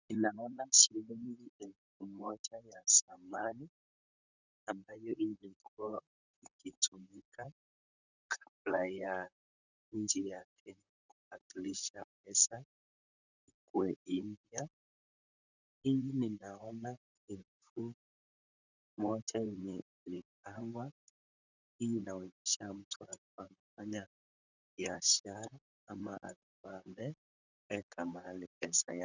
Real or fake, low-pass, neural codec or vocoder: fake; 7.2 kHz; vocoder, 44.1 kHz, 128 mel bands, Pupu-Vocoder